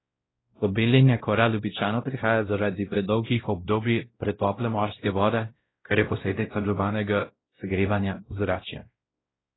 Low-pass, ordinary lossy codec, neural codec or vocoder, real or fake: 7.2 kHz; AAC, 16 kbps; codec, 16 kHz, 0.5 kbps, X-Codec, WavLM features, trained on Multilingual LibriSpeech; fake